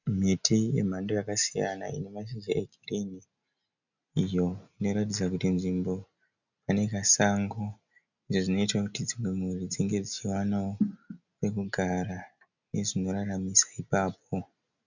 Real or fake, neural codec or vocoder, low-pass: real; none; 7.2 kHz